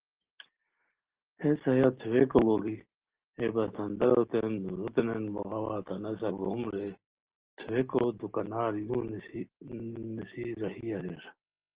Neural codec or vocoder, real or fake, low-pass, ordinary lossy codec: none; real; 3.6 kHz; Opus, 16 kbps